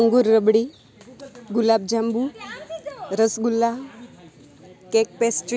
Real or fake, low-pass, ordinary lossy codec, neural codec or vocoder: real; none; none; none